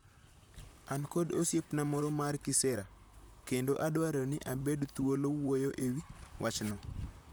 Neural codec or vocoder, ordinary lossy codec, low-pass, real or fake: vocoder, 44.1 kHz, 128 mel bands, Pupu-Vocoder; none; none; fake